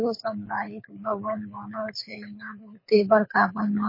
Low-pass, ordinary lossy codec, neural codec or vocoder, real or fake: 5.4 kHz; MP3, 24 kbps; codec, 24 kHz, 6 kbps, HILCodec; fake